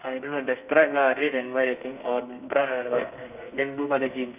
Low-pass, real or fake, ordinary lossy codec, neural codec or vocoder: 3.6 kHz; fake; none; codec, 32 kHz, 1.9 kbps, SNAC